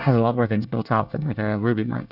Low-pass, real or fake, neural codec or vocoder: 5.4 kHz; fake; codec, 24 kHz, 1 kbps, SNAC